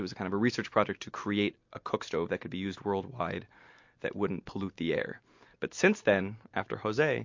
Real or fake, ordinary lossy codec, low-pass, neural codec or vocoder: real; MP3, 48 kbps; 7.2 kHz; none